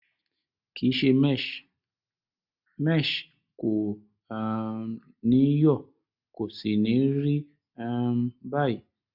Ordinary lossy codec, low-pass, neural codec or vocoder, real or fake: none; 5.4 kHz; none; real